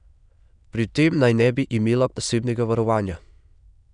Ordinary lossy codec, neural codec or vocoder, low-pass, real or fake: none; autoencoder, 22.05 kHz, a latent of 192 numbers a frame, VITS, trained on many speakers; 9.9 kHz; fake